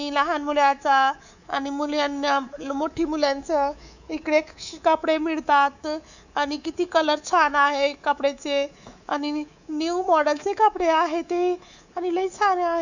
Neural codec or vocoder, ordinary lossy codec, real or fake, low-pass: codec, 24 kHz, 3.1 kbps, DualCodec; none; fake; 7.2 kHz